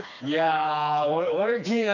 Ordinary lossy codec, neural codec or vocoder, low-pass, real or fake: none; codec, 16 kHz, 2 kbps, FreqCodec, smaller model; 7.2 kHz; fake